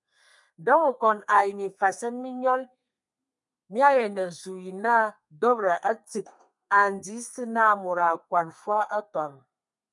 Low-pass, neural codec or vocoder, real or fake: 10.8 kHz; codec, 32 kHz, 1.9 kbps, SNAC; fake